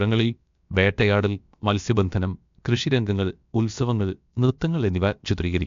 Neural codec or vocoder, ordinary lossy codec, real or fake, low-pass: codec, 16 kHz, about 1 kbps, DyCAST, with the encoder's durations; MP3, 64 kbps; fake; 7.2 kHz